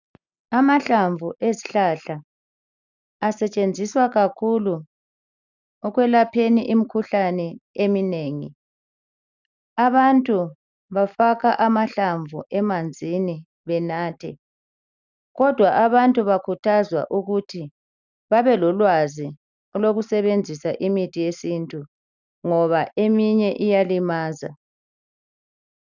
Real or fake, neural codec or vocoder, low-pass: real; none; 7.2 kHz